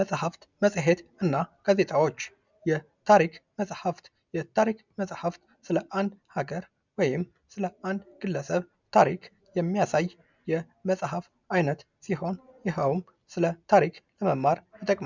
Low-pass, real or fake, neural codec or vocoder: 7.2 kHz; real; none